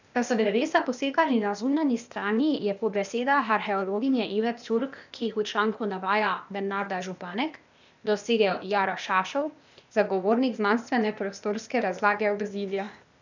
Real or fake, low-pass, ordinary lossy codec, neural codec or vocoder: fake; 7.2 kHz; none; codec, 16 kHz, 0.8 kbps, ZipCodec